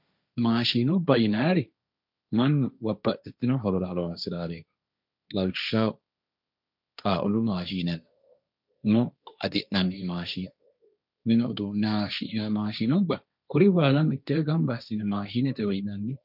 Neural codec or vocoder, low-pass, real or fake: codec, 16 kHz, 1.1 kbps, Voila-Tokenizer; 5.4 kHz; fake